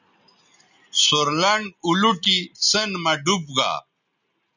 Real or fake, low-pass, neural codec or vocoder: real; 7.2 kHz; none